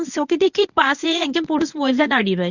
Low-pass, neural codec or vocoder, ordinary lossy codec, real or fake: 7.2 kHz; codec, 24 kHz, 0.9 kbps, WavTokenizer, medium speech release version 1; none; fake